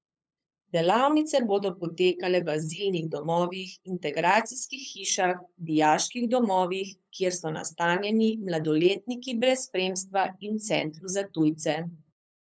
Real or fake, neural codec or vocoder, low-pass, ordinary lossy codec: fake; codec, 16 kHz, 8 kbps, FunCodec, trained on LibriTTS, 25 frames a second; none; none